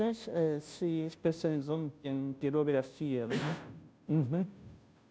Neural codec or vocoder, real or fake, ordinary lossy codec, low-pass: codec, 16 kHz, 0.5 kbps, FunCodec, trained on Chinese and English, 25 frames a second; fake; none; none